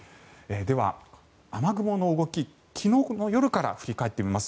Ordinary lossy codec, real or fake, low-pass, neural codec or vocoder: none; real; none; none